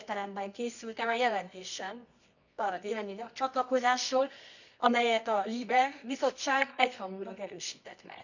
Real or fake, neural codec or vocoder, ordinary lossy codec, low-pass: fake; codec, 24 kHz, 0.9 kbps, WavTokenizer, medium music audio release; none; 7.2 kHz